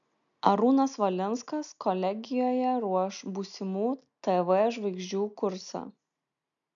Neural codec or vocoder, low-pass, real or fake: none; 7.2 kHz; real